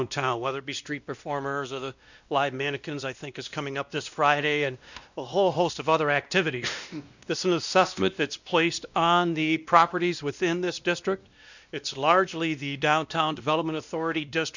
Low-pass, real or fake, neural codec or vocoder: 7.2 kHz; fake; codec, 16 kHz, 1 kbps, X-Codec, WavLM features, trained on Multilingual LibriSpeech